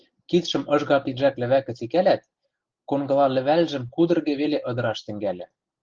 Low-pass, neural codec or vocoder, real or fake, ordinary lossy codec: 7.2 kHz; none; real; Opus, 16 kbps